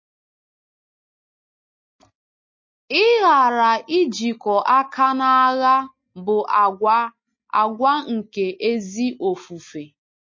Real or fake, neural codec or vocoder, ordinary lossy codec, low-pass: real; none; MP3, 32 kbps; 7.2 kHz